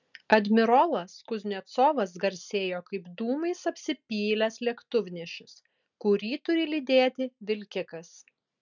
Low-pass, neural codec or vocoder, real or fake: 7.2 kHz; none; real